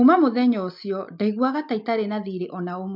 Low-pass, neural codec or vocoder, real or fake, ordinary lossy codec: 5.4 kHz; none; real; MP3, 48 kbps